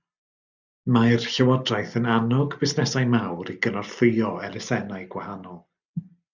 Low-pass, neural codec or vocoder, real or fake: 7.2 kHz; none; real